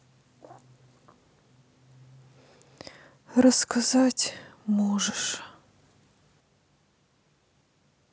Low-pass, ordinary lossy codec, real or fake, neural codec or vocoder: none; none; real; none